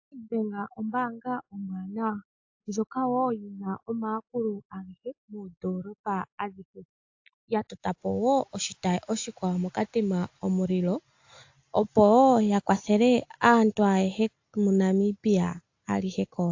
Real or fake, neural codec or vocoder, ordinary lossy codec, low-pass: real; none; AAC, 48 kbps; 7.2 kHz